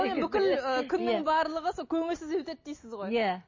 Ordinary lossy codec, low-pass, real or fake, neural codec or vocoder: MP3, 32 kbps; 7.2 kHz; real; none